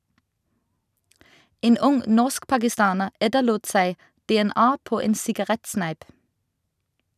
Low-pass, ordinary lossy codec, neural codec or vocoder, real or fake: 14.4 kHz; none; none; real